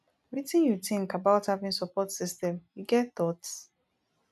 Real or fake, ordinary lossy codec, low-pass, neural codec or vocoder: real; none; 14.4 kHz; none